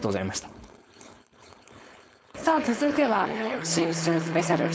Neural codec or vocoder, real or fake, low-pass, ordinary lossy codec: codec, 16 kHz, 4.8 kbps, FACodec; fake; none; none